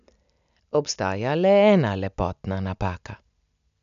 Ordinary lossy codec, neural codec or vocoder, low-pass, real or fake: none; none; 7.2 kHz; real